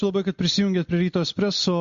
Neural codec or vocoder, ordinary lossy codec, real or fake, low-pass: none; MP3, 48 kbps; real; 7.2 kHz